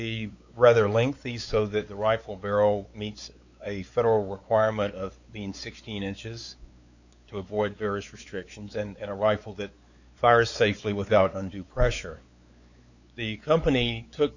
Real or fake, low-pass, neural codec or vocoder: fake; 7.2 kHz; codec, 16 kHz, 4 kbps, X-Codec, WavLM features, trained on Multilingual LibriSpeech